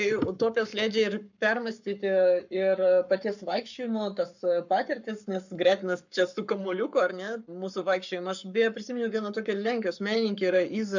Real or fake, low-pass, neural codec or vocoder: fake; 7.2 kHz; codec, 44.1 kHz, 7.8 kbps, Pupu-Codec